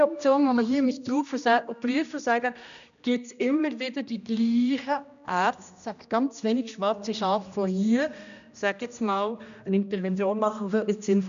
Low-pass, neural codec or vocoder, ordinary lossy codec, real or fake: 7.2 kHz; codec, 16 kHz, 1 kbps, X-Codec, HuBERT features, trained on general audio; none; fake